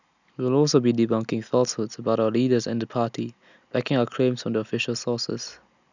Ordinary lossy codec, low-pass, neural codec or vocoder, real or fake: none; 7.2 kHz; none; real